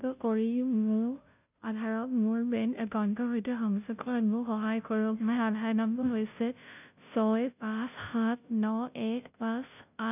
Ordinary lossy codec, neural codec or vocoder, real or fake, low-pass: none; codec, 16 kHz, 0.5 kbps, FunCodec, trained on Chinese and English, 25 frames a second; fake; 3.6 kHz